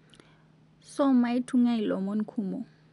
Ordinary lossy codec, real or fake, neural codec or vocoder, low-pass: MP3, 96 kbps; real; none; 10.8 kHz